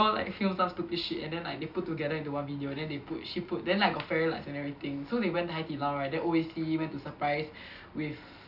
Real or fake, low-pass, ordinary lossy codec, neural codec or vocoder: real; 5.4 kHz; none; none